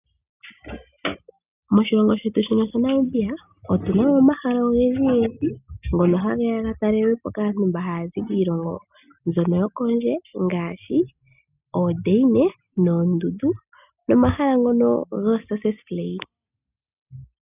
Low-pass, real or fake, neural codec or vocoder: 3.6 kHz; real; none